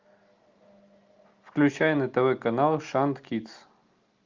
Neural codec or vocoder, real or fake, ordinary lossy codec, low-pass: none; real; Opus, 24 kbps; 7.2 kHz